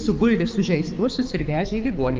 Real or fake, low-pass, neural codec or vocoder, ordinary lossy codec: fake; 7.2 kHz; codec, 16 kHz, 4 kbps, X-Codec, HuBERT features, trained on balanced general audio; Opus, 32 kbps